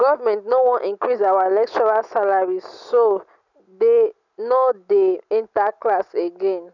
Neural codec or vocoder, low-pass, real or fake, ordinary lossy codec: none; 7.2 kHz; real; none